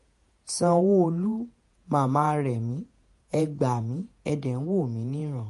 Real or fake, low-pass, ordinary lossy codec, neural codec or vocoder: fake; 14.4 kHz; MP3, 48 kbps; vocoder, 48 kHz, 128 mel bands, Vocos